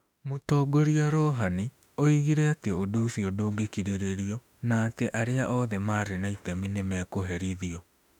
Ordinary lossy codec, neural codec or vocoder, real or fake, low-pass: none; autoencoder, 48 kHz, 32 numbers a frame, DAC-VAE, trained on Japanese speech; fake; 19.8 kHz